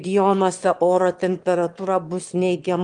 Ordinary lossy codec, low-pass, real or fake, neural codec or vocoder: Opus, 24 kbps; 9.9 kHz; fake; autoencoder, 22.05 kHz, a latent of 192 numbers a frame, VITS, trained on one speaker